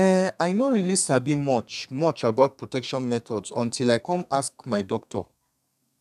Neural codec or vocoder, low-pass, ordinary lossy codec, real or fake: codec, 32 kHz, 1.9 kbps, SNAC; 14.4 kHz; none; fake